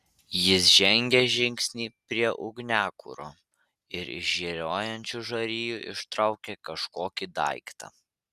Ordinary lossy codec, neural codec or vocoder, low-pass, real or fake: Opus, 64 kbps; none; 14.4 kHz; real